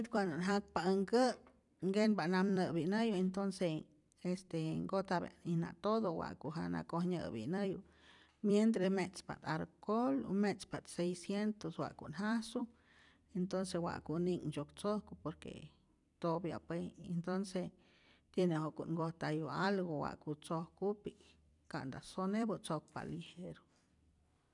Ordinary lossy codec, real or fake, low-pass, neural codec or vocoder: none; fake; 10.8 kHz; vocoder, 44.1 kHz, 128 mel bands every 256 samples, BigVGAN v2